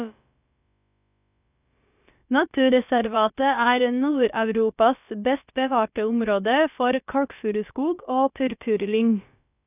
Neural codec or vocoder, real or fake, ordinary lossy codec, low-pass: codec, 16 kHz, about 1 kbps, DyCAST, with the encoder's durations; fake; none; 3.6 kHz